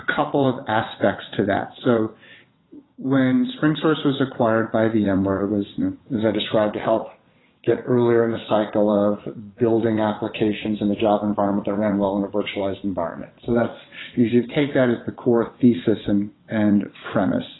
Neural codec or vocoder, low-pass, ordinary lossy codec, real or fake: vocoder, 22.05 kHz, 80 mel bands, WaveNeXt; 7.2 kHz; AAC, 16 kbps; fake